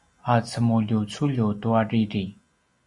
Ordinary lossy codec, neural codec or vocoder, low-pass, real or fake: Opus, 64 kbps; none; 10.8 kHz; real